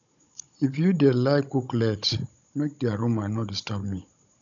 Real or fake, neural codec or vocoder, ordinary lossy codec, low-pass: fake; codec, 16 kHz, 16 kbps, FunCodec, trained on Chinese and English, 50 frames a second; none; 7.2 kHz